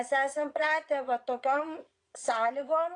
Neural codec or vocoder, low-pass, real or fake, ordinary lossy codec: vocoder, 22.05 kHz, 80 mel bands, Vocos; 9.9 kHz; fake; AAC, 48 kbps